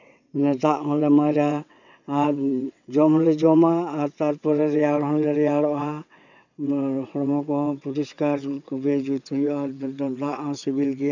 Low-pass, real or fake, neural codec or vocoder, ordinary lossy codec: 7.2 kHz; fake; vocoder, 22.05 kHz, 80 mel bands, WaveNeXt; none